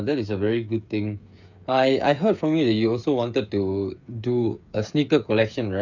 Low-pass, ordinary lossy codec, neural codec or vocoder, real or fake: 7.2 kHz; none; codec, 16 kHz, 8 kbps, FreqCodec, smaller model; fake